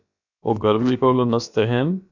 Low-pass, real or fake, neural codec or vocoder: 7.2 kHz; fake; codec, 16 kHz, about 1 kbps, DyCAST, with the encoder's durations